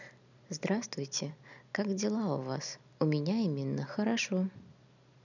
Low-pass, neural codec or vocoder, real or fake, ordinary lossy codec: 7.2 kHz; none; real; none